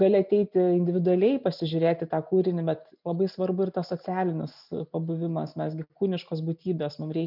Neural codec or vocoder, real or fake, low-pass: none; real; 5.4 kHz